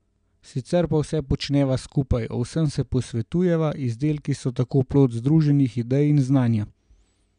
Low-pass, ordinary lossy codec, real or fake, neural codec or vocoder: 9.9 kHz; none; real; none